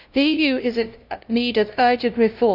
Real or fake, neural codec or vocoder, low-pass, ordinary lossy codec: fake; codec, 16 kHz, 0.5 kbps, FunCodec, trained on LibriTTS, 25 frames a second; 5.4 kHz; none